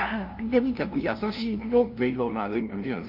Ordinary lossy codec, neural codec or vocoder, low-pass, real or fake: Opus, 24 kbps; codec, 16 kHz, 0.5 kbps, FunCodec, trained on LibriTTS, 25 frames a second; 5.4 kHz; fake